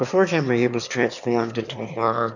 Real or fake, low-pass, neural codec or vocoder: fake; 7.2 kHz; autoencoder, 22.05 kHz, a latent of 192 numbers a frame, VITS, trained on one speaker